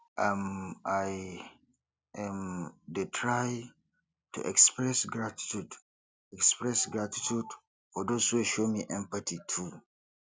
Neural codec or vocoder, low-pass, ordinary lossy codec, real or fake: none; none; none; real